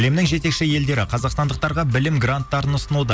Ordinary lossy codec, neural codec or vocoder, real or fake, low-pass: none; none; real; none